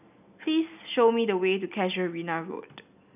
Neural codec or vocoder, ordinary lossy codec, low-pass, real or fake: none; none; 3.6 kHz; real